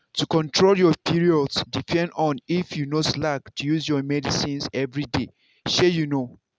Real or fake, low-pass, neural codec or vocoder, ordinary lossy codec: real; none; none; none